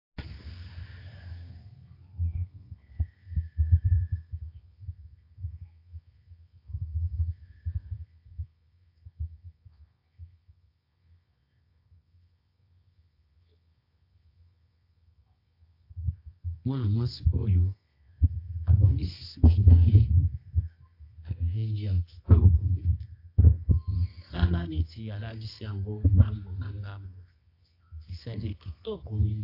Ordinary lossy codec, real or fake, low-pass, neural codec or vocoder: AAC, 32 kbps; fake; 5.4 kHz; codec, 24 kHz, 0.9 kbps, WavTokenizer, medium music audio release